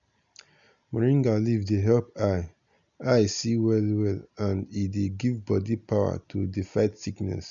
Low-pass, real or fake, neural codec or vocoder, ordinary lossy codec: 7.2 kHz; real; none; none